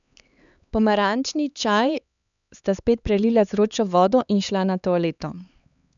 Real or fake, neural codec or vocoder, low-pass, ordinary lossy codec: fake; codec, 16 kHz, 4 kbps, X-Codec, HuBERT features, trained on LibriSpeech; 7.2 kHz; none